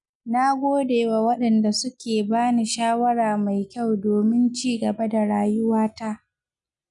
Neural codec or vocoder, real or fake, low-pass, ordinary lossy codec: none; real; 10.8 kHz; none